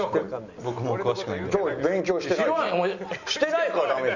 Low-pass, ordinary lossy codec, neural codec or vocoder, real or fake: 7.2 kHz; none; none; real